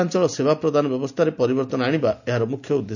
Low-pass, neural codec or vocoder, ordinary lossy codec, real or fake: 7.2 kHz; none; none; real